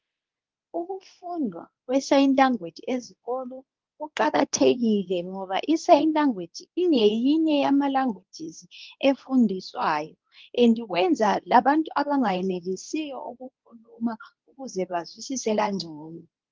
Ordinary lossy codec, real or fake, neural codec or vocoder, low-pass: Opus, 32 kbps; fake; codec, 24 kHz, 0.9 kbps, WavTokenizer, medium speech release version 2; 7.2 kHz